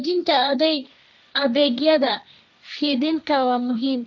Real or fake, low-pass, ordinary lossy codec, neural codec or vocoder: fake; none; none; codec, 16 kHz, 1.1 kbps, Voila-Tokenizer